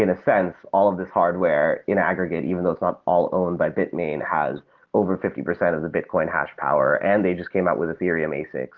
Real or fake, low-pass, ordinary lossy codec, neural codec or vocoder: real; 7.2 kHz; Opus, 16 kbps; none